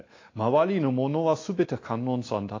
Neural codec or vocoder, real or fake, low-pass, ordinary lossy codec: none; real; 7.2 kHz; AAC, 32 kbps